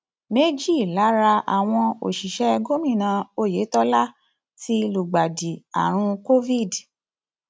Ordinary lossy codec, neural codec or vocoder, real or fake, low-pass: none; none; real; none